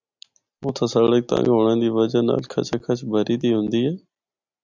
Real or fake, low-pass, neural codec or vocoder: real; 7.2 kHz; none